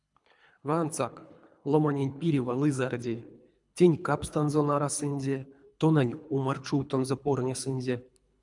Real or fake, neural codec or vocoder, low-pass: fake; codec, 24 kHz, 3 kbps, HILCodec; 10.8 kHz